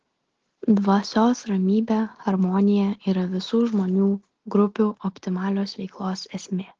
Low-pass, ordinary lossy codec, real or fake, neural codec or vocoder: 7.2 kHz; Opus, 16 kbps; real; none